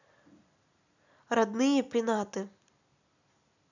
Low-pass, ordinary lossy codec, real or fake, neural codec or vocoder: 7.2 kHz; none; real; none